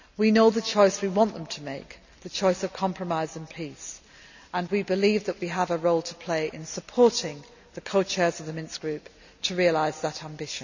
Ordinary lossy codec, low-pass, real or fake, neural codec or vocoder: none; 7.2 kHz; real; none